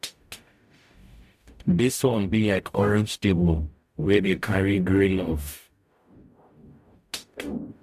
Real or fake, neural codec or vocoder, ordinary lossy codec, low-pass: fake; codec, 44.1 kHz, 0.9 kbps, DAC; AAC, 96 kbps; 14.4 kHz